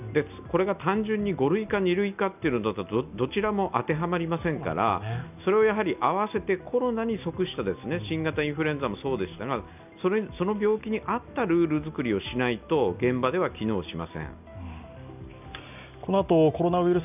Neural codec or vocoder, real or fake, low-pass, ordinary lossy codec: none; real; 3.6 kHz; none